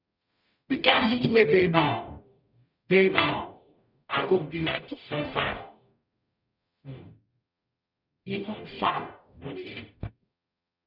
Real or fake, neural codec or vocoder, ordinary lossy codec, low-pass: fake; codec, 44.1 kHz, 0.9 kbps, DAC; none; 5.4 kHz